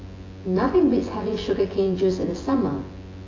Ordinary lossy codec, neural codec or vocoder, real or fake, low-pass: AAC, 32 kbps; vocoder, 24 kHz, 100 mel bands, Vocos; fake; 7.2 kHz